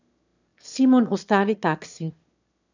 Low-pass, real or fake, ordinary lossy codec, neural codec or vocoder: 7.2 kHz; fake; none; autoencoder, 22.05 kHz, a latent of 192 numbers a frame, VITS, trained on one speaker